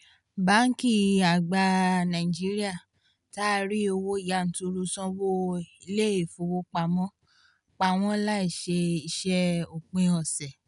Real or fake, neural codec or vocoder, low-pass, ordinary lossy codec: real; none; 10.8 kHz; none